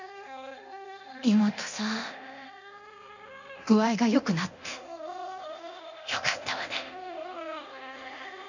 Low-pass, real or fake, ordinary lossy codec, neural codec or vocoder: 7.2 kHz; fake; none; codec, 24 kHz, 0.9 kbps, DualCodec